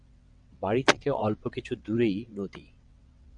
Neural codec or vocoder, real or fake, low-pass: vocoder, 22.05 kHz, 80 mel bands, WaveNeXt; fake; 9.9 kHz